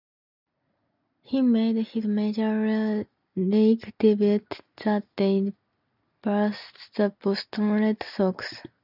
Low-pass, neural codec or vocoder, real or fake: 5.4 kHz; none; real